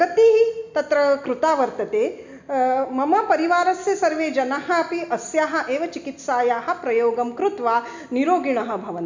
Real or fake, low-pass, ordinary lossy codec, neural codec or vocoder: real; 7.2 kHz; MP3, 64 kbps; none